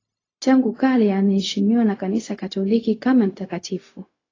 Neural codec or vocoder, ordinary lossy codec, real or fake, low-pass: codec, 16 kHz, 0.4 kbps, LongCat-Audio-Codec; AAC, 32 kbps; fake; 7.2 kHz